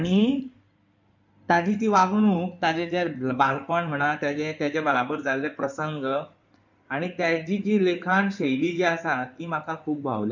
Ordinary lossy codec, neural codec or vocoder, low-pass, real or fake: none; codec, 16 kHz in and 24 kHz out, 2.2 kbps, FireRedTTS-2 codec; 7.2 kHz; fake